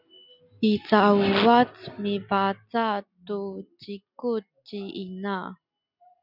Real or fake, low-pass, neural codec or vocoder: real; 5.4 kHz; none